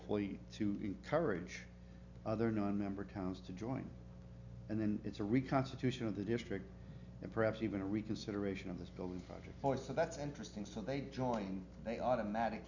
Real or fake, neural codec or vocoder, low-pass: real; none; 7.2 kHz